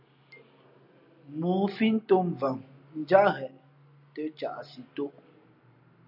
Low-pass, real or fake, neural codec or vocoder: 5.4 kHz; real; none